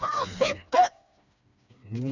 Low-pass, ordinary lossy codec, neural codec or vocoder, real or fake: 7.2 kHz; none; codec, 16 kHz, 2 kbps, FreqCodec, smaller model; fake